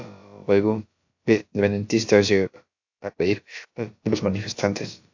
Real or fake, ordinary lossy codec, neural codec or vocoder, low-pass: fake; AAC, 48 kbps; codec, 16 kHz, about 1 kbps, DyCAST, with the encoder's durations; 7.2 kHz